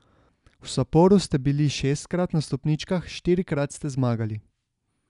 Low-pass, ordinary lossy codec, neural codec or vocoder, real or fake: 10.8 kHz; none; none; real